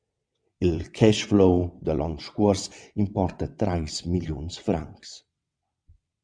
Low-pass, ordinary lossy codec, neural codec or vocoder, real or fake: 9.9 kHz; Opus, 64 kbps; vocoder, 22.05 kHz, 80 mel bands, WaveNeXt; fake